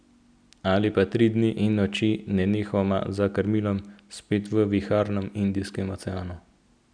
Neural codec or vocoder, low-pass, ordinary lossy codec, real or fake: none; 9.9 kHz; none; real